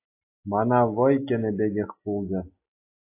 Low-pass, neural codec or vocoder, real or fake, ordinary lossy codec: 3.6 kHz; none; real; AAC, 24 kbps